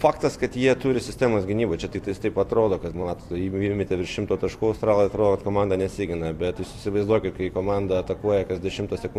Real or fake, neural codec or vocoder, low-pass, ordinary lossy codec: fake; vocoder, 44.1 kHz, 128 mel bands every 256 samples, BigVGAN v2; 14.4 kHz; AAC, 64 kbps